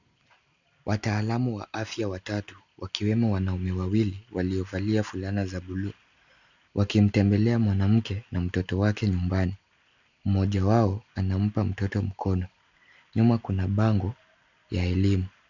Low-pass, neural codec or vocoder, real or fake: 7.2 kHz; none; real